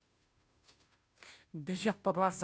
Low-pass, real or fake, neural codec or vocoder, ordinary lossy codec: none; fake; codec, 16 kHz, 0.5 kbps, FunCodec, trained on Chinese and English, 25 frames a second; none